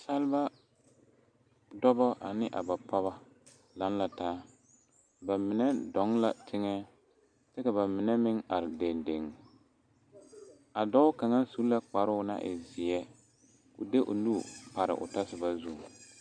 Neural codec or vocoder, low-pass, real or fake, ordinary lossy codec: none; 9.9 kHz; real; MP3, 96 kbps